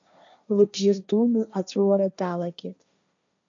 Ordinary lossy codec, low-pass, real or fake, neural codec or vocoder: MP3, 64 kbps; 7.2 kHz; fake; codec, 16 kHz, 1.1 kbps, Voila-Tokenizer